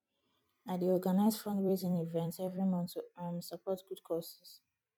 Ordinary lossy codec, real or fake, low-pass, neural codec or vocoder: MP3, 96 kbps; fake; 19.8 kHz; vocoder, 44.1 kHz, 128 mel bands every 256 samples, BigVGAN v2